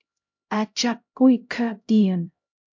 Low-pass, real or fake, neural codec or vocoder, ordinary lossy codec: 7.2 kHz; fake; codec, 16 kHz, 0.5 kbps, X-Codec, HuBERT features, trained on LibriSpeech; MP3, 64 kbps